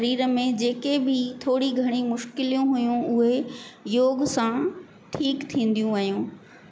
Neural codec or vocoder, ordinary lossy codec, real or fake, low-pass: none; none; real; none